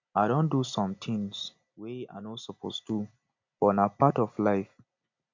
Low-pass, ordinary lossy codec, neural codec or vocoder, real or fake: 7.2 kHz; MP3, 64 kbps; none; real